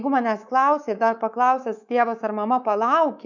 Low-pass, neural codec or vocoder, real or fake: 7.2 kHz; codec, 24 kHz, 3.1 kbps, DualCodec; fake